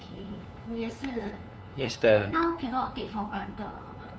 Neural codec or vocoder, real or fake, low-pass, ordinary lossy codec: codec, 16 kHz, 2 kbps, FunCodec, trained on LibriTTS, 25 frames a second; fake; none; none